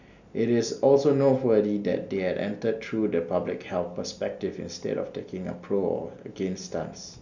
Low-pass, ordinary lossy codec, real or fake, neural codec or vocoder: 7.2 kHz; none; real; none